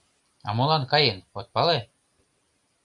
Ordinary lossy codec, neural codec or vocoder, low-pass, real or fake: Opus, 64 kbps; none; 10.8 kHz; real